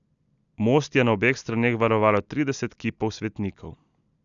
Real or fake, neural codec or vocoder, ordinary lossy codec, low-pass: real; none; none; 7.2 kHz